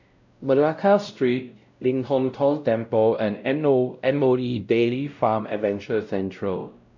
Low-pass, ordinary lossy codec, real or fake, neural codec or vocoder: 7.2 kHz; none; fake; codec, 16 kHz, 0.5 kbps, X-Codec, WavLM features, trained on Multilingual LibriSpeech